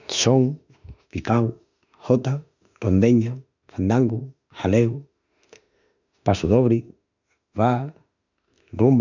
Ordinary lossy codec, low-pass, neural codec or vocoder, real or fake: none; 7.2 kHz; autoencoder, 48 kHz, 32 numbers a frame, DAC-VAE, trained on Japanese speech; fake